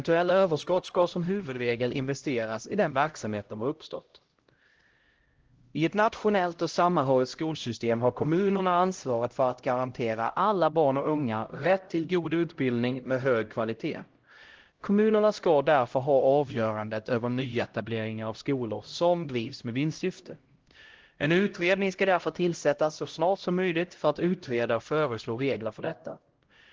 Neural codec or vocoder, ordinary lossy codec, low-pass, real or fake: codec, 16 kHz, 0.5 kbps, X-Codec, HuBERT features, trained on LibriSpeech; Opus, 16 kbps; 7.2 kHz; fake